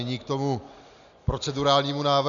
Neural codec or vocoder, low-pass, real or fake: none; 7.2 kHz; real